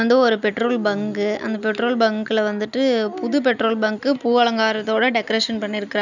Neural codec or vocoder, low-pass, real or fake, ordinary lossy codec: none; 7.2 kHz; real; none